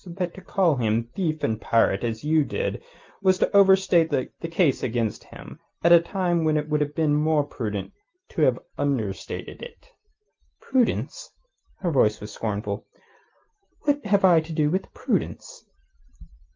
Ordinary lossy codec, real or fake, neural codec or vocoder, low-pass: Opus, 32 kbps; real; none; 7.2 kHz